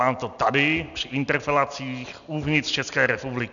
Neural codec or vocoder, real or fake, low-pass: none; real; 7.2 kHz